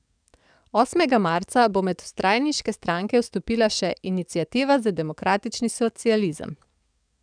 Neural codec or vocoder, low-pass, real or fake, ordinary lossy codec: codec, 44.1 kHz, 7.8 kbps, DAC; 9.9 kHz; fake; none